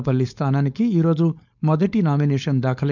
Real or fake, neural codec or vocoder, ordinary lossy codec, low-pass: fake; codec, 16 kHz, 4.8 kbps, FACodec; none; 7.2 kHz